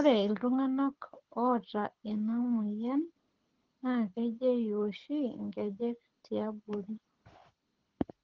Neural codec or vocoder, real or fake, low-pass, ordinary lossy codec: codec, 24 kHz, 6 kbps, HILCodec; fake; 7.2 kHz; Opus, 16 kbps